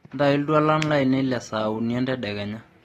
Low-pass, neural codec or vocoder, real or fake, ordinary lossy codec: 19.8 kHz; none; real; AAC, 32 kbps